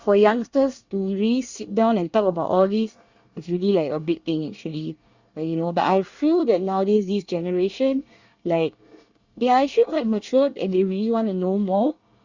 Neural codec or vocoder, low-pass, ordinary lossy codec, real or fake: codec, 24 kHz, 1 kbps, SNAC; 7.2 kHz; Opus, 64 kbps; fake